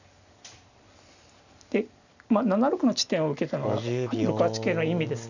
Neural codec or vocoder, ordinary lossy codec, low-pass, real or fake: none; none; 7.2 kHz; real